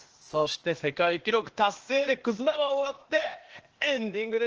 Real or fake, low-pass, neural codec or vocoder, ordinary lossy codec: fake; 7.2 kHz; codec, 16 kHz, 0.8 kbps, ZipCodec; Opus, 16 kbps